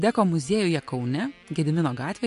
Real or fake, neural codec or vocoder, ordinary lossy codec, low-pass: real; none; MP3, 64 kbps; 10.8 kHz